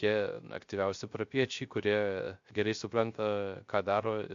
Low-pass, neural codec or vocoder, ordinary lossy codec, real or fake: 7.2 kHz; codec, 16 kHz, 0.3 kbps, FocalCodec; MP3, 48 kbps; fake